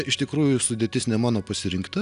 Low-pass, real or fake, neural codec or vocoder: 10.8 kHz; real; none